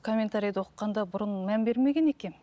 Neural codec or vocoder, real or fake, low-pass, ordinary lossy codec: none; real; none; none